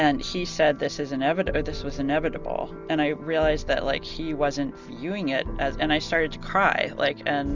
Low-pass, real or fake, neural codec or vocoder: 7.2 kHz; real; none